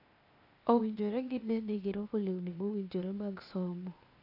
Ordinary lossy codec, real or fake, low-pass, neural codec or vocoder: AAC, 32 kbps; fake; 5.4 kHz; codec, 16 kHz, 0.8 kbps, ZipCodec